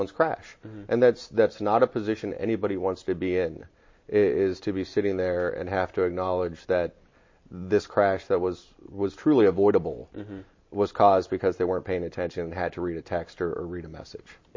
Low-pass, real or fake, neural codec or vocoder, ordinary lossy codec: 7.2 kHz; real; none; MP3, 32 kbps